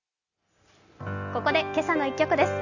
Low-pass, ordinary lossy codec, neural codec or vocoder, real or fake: 7.2 kHz; none; none; real